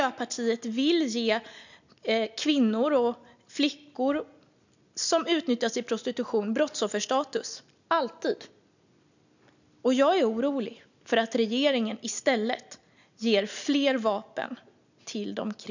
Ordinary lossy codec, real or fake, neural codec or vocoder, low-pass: none; real; none; 7.2 kHz